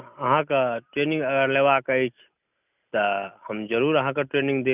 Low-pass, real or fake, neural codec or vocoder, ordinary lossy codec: 3.6 kHz; real; none; none